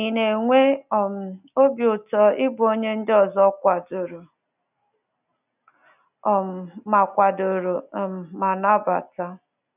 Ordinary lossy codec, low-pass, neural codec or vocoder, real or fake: none; 3.6 kHz; none; real